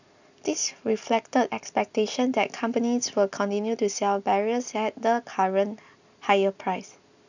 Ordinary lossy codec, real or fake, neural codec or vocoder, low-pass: none; real; none; 7.2 kHz